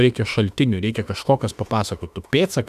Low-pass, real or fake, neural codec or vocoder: 14.4 kHz; fake; autoencoder, 48 kHz, 32 numbers a frame, DAC-VAE, trained on Japanese speech